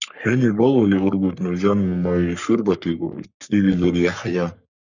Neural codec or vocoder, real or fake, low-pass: codec, 44.1 kHz, 3.4 kbps, Pupu-Codec; fake; 7.2 kHz